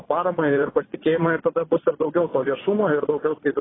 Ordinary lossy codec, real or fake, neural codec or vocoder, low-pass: AAC, 16 kbps; fake; vocoder, 22.05 kHz, 80 mel bands, Vocos; 7.2 kHz